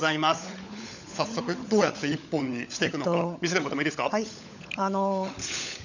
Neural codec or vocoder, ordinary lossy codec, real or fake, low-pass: codec, 16 kHz, 16 kbps, FunCodec, trained on LibriTTS, 50 frames a second; none; fake; 7.2 kHz